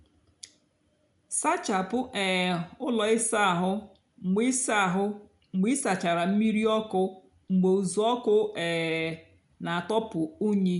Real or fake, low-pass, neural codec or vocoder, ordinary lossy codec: real; 10.8 kHz; none; none